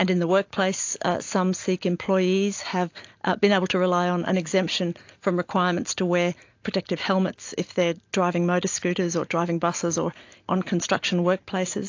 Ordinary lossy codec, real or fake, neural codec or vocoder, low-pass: AAC, 48 kbps; real; none; 7.2 kHz